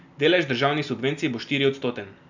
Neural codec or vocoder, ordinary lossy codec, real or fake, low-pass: none; none; real; 7.2 kHz